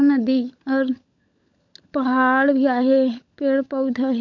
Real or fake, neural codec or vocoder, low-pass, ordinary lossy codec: fake; codec, 16 kHz, 16 kbps, FunCodec, trained on LibriTTS, 50 frames a second; 7.2 kHz; MP3, 64 kbps